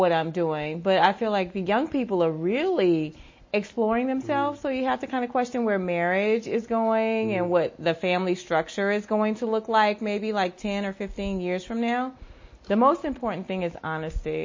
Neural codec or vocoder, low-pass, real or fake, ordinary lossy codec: none; 7.2 kHz; real; MP3, 32 kbps